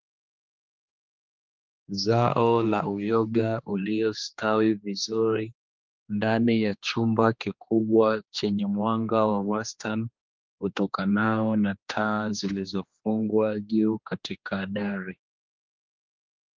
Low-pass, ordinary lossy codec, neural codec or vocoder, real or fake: 7.2 kHz; Opus, 24 kbps; codec, 16 kHz, 2 kbps, X-Codec, HuBERT features, trained on general audio; fake